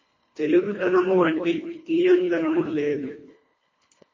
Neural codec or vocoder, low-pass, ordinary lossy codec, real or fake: codec, 24 kHz, 1.5 kbps, HILCodec; 7.2 kHz; MP3, 32 kbps; fake